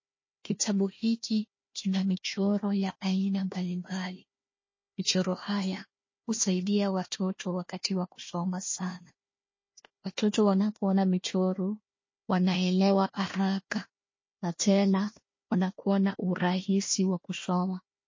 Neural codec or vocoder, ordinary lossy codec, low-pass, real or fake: codec, 16 kHz, 1 kbps, FunCodec, trained on Chinese and English, 50 frames a second; MP3, 32 kbps; 7.2 kHz; fake